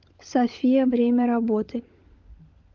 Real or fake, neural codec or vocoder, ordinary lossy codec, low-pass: fake; codec, 16 kHz, 16 kbps, FunCodec, trained on LibriTTS, 50 frames a second; Opus, 24 kbps; 7.2 kHz